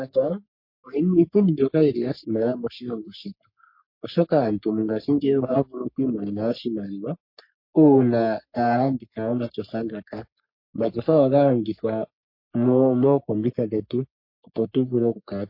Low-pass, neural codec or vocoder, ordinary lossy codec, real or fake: 5.4 kHz; codec, 44.1 kHz, 3.4 kbps, Pupu-Codec; MP3, 32 kbps; fake